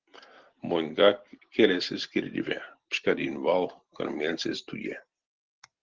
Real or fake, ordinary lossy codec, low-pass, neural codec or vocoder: real; Opus, 16 kbps; 7.2 kHz; none